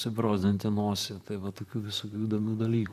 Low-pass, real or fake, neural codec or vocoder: 14.4 kHz; fake; vocoder, 44.1 kHz, 128 mel bands, Pupu-Vocoder